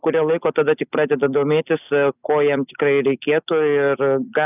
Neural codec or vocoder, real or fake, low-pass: none; real; 3.6 kHz